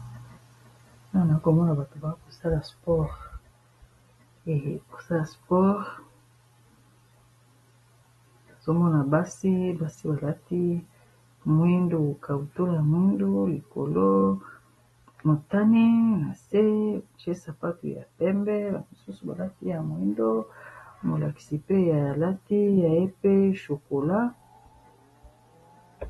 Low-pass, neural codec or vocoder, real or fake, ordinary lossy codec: 19.8 kHz; none; real; AAC, 32 kbps